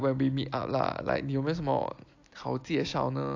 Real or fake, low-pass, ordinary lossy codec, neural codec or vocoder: real; 7.2 kHz; AAC, 48 kbps; none